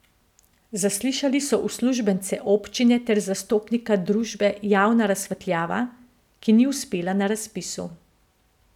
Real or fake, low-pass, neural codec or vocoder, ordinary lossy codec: real; 19.8 kHz; none; none